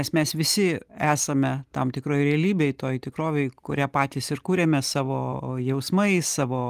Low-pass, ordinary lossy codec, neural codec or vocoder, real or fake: 14.4 kHz; Opus, 24 kbps; none; real